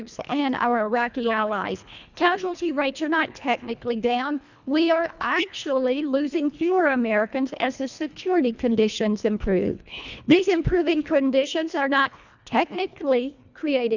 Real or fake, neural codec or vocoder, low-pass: fake; codec, 24 kHz, 1.5 kbps, HILCodec; 7.2 kHz